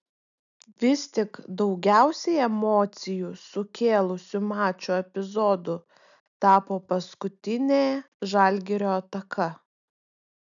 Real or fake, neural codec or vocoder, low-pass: real; none; 7.2 kHz